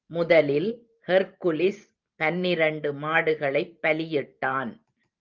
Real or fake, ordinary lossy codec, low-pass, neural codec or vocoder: fake; Opus, 24 kbps; 7.2 kHz; vocoder, 44.1 kHz, 128 mel bands every 512 samples, BigVGAN v2